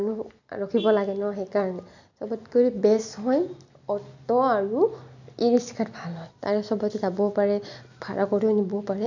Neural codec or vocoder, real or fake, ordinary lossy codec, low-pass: none; real; none; 7.2 kHz